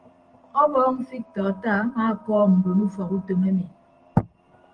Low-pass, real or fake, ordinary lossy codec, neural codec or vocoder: 9.9 kHz; fake; Opus, 24 kbps; vocoder, 44.1 kHz, 128 mel bands every 512 samples, BigVGAN v2